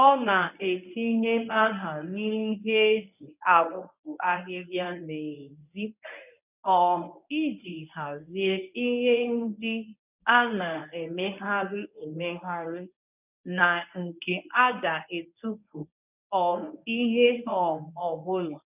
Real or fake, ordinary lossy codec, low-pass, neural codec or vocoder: fake; none; 3.6 kHz; codec, 24 kHz, 0.9 kbps, WavTokenizer, medium speech release version 1